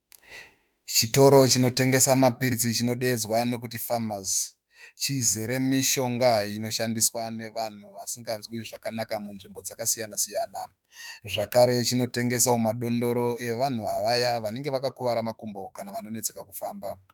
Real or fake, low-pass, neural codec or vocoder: fake; 19.8 kHz; autoencoder, 48 kHz, 32 numbers a frame, DAC-VAE, trained on Japanese speech